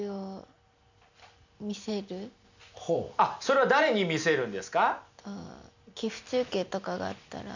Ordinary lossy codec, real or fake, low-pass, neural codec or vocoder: none; real; 7.2 kHz; none